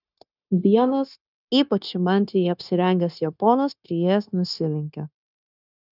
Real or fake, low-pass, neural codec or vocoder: fake; 5.4 kHz; codec, 16 kHz, 0.9 kbps, LongCat-Audio-Codec